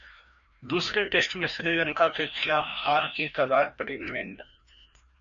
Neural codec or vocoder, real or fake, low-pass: codec, 16 kHz, 1 kbps, FreqCodec, larger model; fake; 7.2 kHz